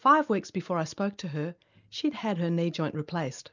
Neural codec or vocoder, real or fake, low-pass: none; real; 7.2 kHz